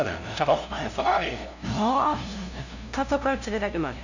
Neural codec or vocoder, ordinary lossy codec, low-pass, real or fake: codec, 16 kHz, 0.5 kbps, FunCodec, trained on LibriTTS, 25 frames a second; none; 7.2 kHz; fake